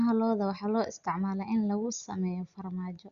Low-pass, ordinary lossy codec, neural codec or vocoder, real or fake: 7.2 kHz; Opus, 64 kbps; none; real